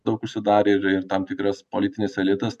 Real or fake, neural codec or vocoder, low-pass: real; none; 14.4 kHz